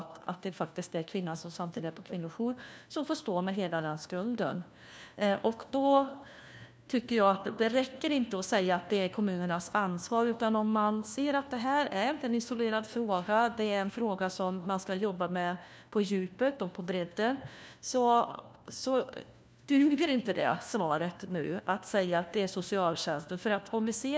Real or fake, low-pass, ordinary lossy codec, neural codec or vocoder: fake; none; none; codec, 16 kHz, 1 kbps, FunCodec, trained on LibriTTS, 50 frames a second